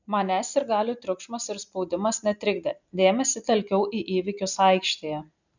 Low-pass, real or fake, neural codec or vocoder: 7.2 kHz; real; none